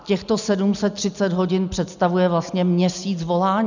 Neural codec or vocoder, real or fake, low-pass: none; real; 7.2 kHz